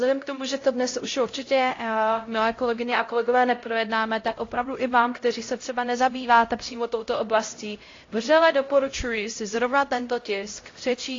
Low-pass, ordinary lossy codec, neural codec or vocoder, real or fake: 7.2 kHz; AAC, 32 kbps; codec, 16 kHz, 0.5 kbps, X-Codec, HuBERT features, trained on LibriSpeech; fake